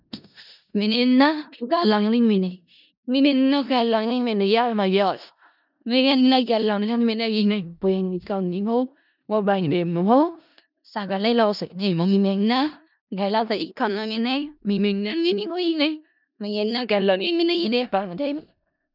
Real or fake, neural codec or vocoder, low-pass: fake; codec, 16 kHz in and 24 kHz out, 0.4 kbps, LongCat-Audio-Codec, four codebook decoder; 5.4 kHz